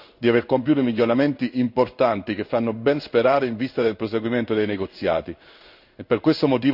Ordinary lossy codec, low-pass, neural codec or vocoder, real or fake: none; 5.4 kHz; codec, 16 kHz in and 24 kHz out, 1 kbps, XY-Tokenizer; fake